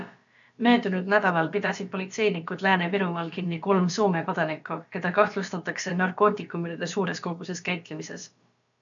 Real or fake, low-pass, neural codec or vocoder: fake; 7.2 kHz; codec, 16 kHz, about 1 kbps, DyCAST, with the encoder's durations